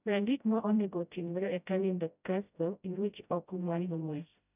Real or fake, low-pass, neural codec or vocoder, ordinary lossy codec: fake; 3.6 kHz; codec, 16 kHz, 0.5 kbps, FreqCodec, smaller model; none